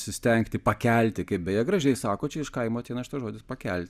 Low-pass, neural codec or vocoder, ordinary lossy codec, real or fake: 14.4 kHz; none; Opus, 64 kbps; real